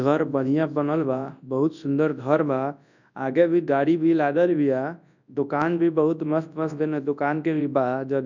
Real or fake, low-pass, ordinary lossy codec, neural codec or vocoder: fake; 7.2 kHz; none; codec, 24 kHz, 0.9 kbps, WavTokenizer, large speech release